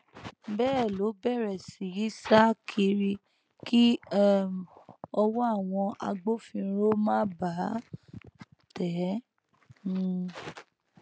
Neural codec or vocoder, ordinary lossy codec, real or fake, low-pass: none; none; real; none